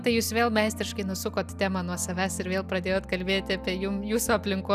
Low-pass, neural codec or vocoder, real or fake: 14.4 kHz; none; real